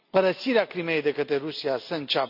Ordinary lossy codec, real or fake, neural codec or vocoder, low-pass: none; real; none; 5.4 kHz